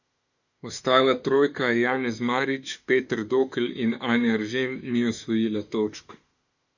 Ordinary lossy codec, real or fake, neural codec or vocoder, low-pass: none; fake; autoencoder, 48 kHz, 32 numbers a frame, DAC-VAE, trained on Japanese speech; 7.2 kHz